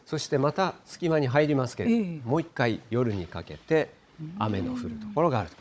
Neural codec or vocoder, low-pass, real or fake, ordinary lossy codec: codec, 16 kHz, 16 kbps, FunCodec, trained on Chinese and English, 50 frames a second; none; fake; none